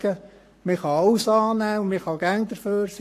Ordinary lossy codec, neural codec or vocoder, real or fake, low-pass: AAC, 64 kbps; codec, 44.1 kHz, 7.8 kbps, Pupu-Codec; fake; 14.4 kHz